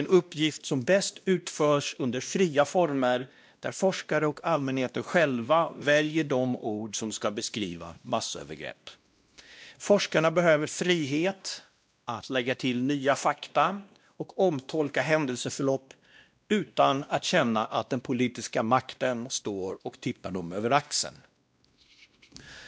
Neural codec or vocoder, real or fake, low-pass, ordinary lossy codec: codec, 16 kHz, 1 kbps, X-Codec, WavLM features, trained on Multilingual LibriSpeech; fake; none; none